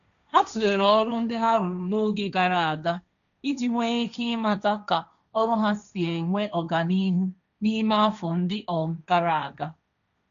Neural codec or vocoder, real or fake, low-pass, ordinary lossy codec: codec, 16 kHz, 1.1 kbps, Voila-Tokenizer; fake; 7.2 kHz; none